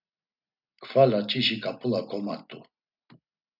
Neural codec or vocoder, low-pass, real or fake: none; 5.4 kHz; real